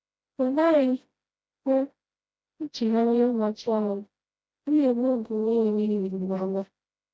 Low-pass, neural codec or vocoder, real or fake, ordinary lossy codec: none; codec, 16 kHz, 0.5 kbps, FreqCodec, smaller model; fake; none